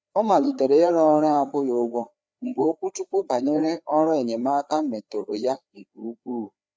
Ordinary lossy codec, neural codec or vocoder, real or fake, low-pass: none; codec, 16 kHz, 4 kbps, FreqCodec, larger model; fake; none